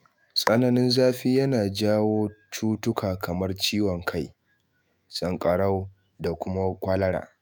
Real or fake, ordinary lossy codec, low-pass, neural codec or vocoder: fake; none; none; autoencoder, 48 kHz, 128 numbers a frame, DAC-VAE, trained on Japanese speech